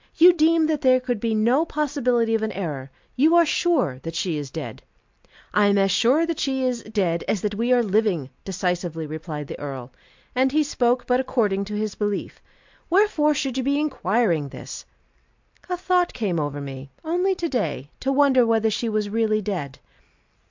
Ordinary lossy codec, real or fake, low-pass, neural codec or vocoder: MP3, 64 kbps; real; 7.2 kHz; none